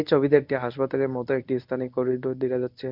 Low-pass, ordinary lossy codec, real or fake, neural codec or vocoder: 5.4 kHz; none; fake; codec, 24 kHz, 0.9 kbps, WavTokenizer, medium speech release version 1